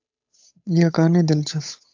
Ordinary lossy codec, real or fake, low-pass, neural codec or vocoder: AAC, 48 kbps; fake; 7.2 kHz; codec, 16 kHz, 8 kbps, FunCodec, trained on Chinese and English, 25 frames a second